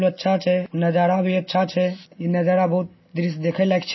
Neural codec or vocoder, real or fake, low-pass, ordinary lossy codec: none; real; 7.2 kHz; MP3, 24 kbps